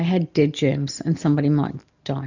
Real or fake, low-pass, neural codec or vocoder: real; 7.2 kHz; none